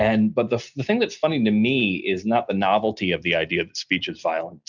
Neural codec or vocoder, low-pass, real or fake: none; 7.2 kHz; real